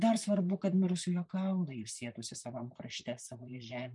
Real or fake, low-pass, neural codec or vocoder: fake; 10.8 kHz; vocoder, 44.1 kHz, 128 mel bands, Pupu-Vocoder